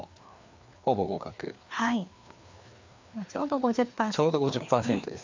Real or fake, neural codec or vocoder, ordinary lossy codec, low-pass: fake; codec, 16 kHz, 2 kbps, FreqCodec, larger model; MP3, 64 kbps; 7.2 kHz